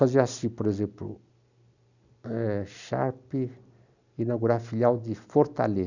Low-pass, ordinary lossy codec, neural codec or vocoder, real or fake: 7.2 kHz; none; none; real